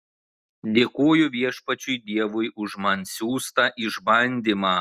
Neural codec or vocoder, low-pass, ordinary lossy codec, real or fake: none; 14.4 kHz; Opus, 64 kbps; real